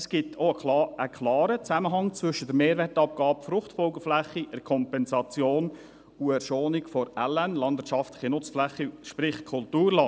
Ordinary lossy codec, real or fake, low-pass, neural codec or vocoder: none; real; none; none